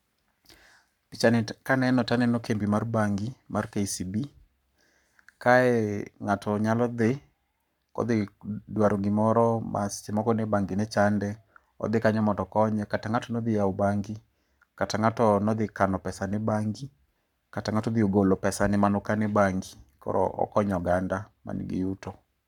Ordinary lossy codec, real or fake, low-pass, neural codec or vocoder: none; fake; 19.8 kHz; codec, 44.1 kHz, 7.8 kbps, Pupu-Codec